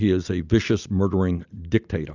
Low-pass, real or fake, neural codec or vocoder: 7.2 kHz; real; none